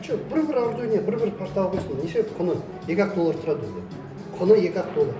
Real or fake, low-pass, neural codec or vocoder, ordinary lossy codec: real; none; none; none